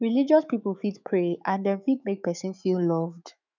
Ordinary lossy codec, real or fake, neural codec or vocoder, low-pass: none; fake; autoencoder, 48 kHz, 128 numbers a frame, DAC-VAE, trained on Japanese speech; 7.2 kHz